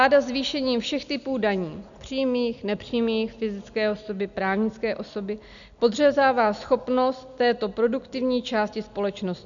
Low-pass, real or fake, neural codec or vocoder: 7.2 kHz; real; none